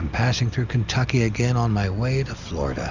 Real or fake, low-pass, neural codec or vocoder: real; 7.2 kHz; none